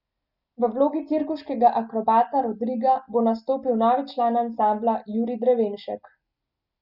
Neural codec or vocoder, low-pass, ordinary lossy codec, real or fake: none; 5.4 kHz; none; real